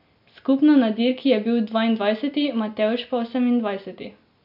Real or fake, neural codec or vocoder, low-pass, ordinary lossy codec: real; none; 5.4 kHz; MP3, 48 kbps